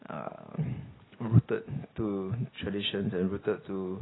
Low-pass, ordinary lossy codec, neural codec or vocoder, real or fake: 7.2 kHz; AAC, 16 kbps; none; real